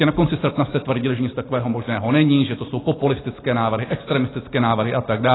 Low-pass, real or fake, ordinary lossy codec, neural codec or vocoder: 7.2 kHz; real; AAC, 16 kbps; none